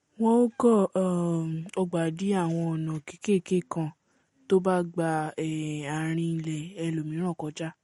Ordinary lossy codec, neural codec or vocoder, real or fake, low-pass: MP3, 48 kbps; none; real; 19.8 kHz